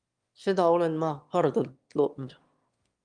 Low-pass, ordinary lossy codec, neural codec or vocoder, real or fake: 9.9 kHz; Opus, 32 kbps; autoencoder, 22.05 kHz, a latent of 192 numbers a frame, VITS, trained on one speaker; fake